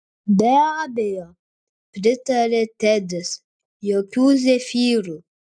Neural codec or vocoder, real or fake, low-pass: none; real; 9.9 kHz